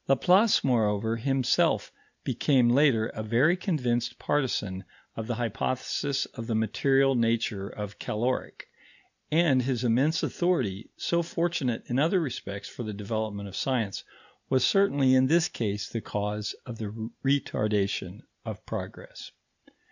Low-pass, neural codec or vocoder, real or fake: 7.2 kHz; none; real